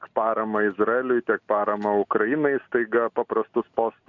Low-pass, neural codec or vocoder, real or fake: 7.2 kHz; none; real